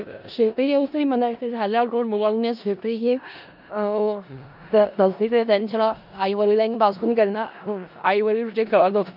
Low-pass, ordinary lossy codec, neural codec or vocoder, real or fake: 5.4 kHz; none; codec, 16 kHz in and 24 kHz out, 0.4 kbps, LongCat-Audio-Codec, four codebook decoder; fake